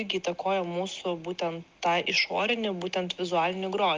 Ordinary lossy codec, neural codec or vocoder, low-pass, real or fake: Opus, 32 kbps; none; 7.2 kHz; real